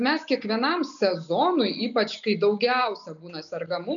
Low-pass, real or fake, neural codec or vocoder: 7.2 kHz; real; none